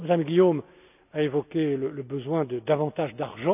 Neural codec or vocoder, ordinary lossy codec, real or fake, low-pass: none; none; real; 3.6 kHz